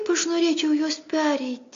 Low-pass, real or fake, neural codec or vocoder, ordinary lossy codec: 7.2 kHz; real; none; AAC, 48 kbps